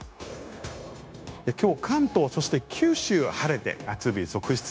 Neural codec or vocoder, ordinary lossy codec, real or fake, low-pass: codec, 16 kHz, 0.9 kbps, LongCat-Audio-Codec; none; fake; none